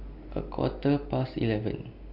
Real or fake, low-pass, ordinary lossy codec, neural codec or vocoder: real; 5.4 kHz; MP3, 48 kbps; none